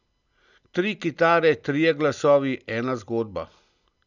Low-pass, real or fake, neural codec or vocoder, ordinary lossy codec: 7.2 kHz; real; none; none